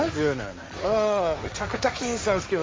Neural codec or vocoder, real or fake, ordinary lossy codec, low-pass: codec, 16 kHz, 1.1 kbps, Voila-Tokenizer; fake; none; none